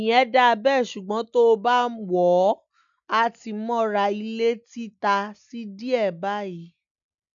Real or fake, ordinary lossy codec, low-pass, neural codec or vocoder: real; none; 7.2 kHz; none